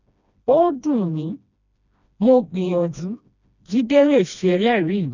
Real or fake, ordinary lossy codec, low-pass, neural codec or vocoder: fake; none; 7.2 kHz; codec, 16 kHz, 1 kbps, FreqCodec, smaller model